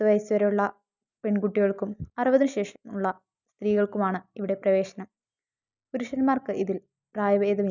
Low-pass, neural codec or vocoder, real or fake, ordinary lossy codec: 7.2 kHz; none; real; none